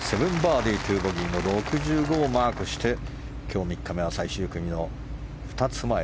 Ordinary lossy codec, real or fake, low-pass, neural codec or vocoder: none; real; none; none